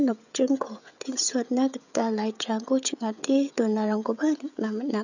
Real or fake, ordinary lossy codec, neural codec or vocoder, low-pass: fake; none; codec, 16 kHz, 16 kbps, FreqCodec, smaller model; 7.2 kHz